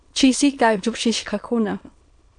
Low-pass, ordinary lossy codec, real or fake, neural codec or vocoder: 9.9 kHz; AAC, 64 kbps; fake; autoencoder, 22.05 kHz, a latent of 192 numbers a frame, VITS, trained on many speakers